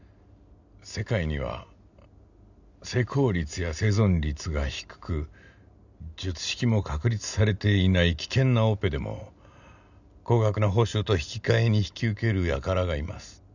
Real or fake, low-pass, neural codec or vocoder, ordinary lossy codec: real; 7.2 kHz; none; none